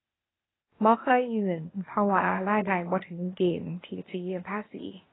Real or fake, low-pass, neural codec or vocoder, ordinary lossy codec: fake; 7.2 kHz; codec, 16 kHz, 0.8 kbps, ZipCodec; AAC, 16 kbps